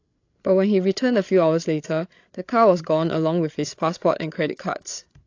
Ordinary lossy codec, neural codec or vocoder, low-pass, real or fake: AAC, 48 kbps; codec, 16 kHz, 8 kbps, FreqCodec, larger model; 7.2 kHz; fake